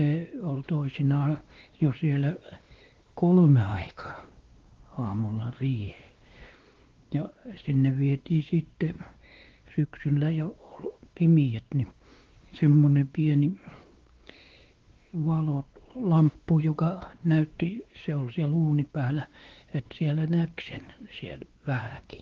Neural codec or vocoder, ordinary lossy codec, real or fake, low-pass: codec, 16 kHz, 2 kbps, X-Codec, WavLM features, trained on Multilingual LibriSpeech; Opus, 16 kbps; fake; 7.2 kHz